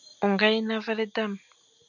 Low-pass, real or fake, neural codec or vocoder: 7.2 kHz; real; none